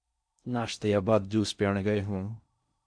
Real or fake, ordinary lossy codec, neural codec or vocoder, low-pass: fake; Opus, 64 kbps; codec, 16 kHz in and 24 kHz out, 0.6 kbps, FocalCodec, streaming, 4096 codes; 9.9 kHz